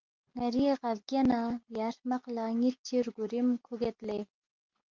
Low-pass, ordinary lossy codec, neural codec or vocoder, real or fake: 7.2 kHz; Opus, 24 kbps; none; real